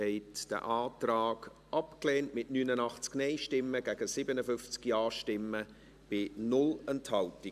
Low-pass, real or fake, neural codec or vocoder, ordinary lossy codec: 14.4 kHz; real; none; AAC, 96 kbps